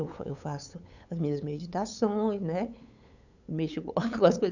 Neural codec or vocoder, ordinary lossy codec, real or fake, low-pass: codec, 16 kHz, 8 kbps, FunCodec, trained on LibriTTS, 25 frames a second; none; fake; 7.2 kHz